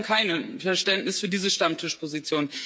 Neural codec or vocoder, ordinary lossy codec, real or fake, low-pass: codec, 16 kHz, 8 kbps, FreqCodec, smaller model; none; fake; none